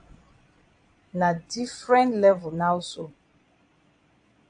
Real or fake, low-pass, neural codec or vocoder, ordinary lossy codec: fake; 9.9 kHz; vocoder, 22.05 kHz, 80 mel bands, Vocos; AAC, 64 kbps